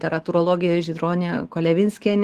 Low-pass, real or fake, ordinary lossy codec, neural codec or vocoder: 14.4 kHz; fake; Opus, 16 kbps; autoencoder, 48 kHz, 128 numbers a frame, DAC-VAE, trained on Japanese speech